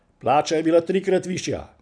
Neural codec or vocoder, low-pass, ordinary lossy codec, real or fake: none; 9.9 kHz; none; real